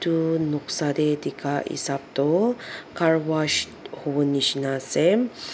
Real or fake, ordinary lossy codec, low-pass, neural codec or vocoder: real; none; none; none